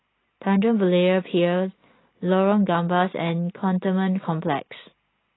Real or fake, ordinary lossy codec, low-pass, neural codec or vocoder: real; AAC, 16 kbps; 7.2 kHz; none